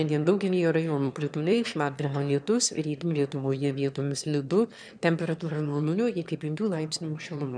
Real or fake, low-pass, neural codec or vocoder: fake; 9.9 kHz; autoencoder, 22.05 kHz, a latent of 192 numbers a frame, VITS, trained on one speaker